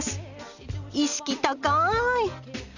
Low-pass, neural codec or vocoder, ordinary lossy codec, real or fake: 7.2 kHz; none; none; real